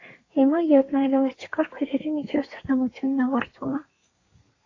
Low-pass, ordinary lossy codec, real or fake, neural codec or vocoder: 7.2 kHz; AAC, 32 kbps; fake; codec, 32 kHz, 1.9 kbps, SNAC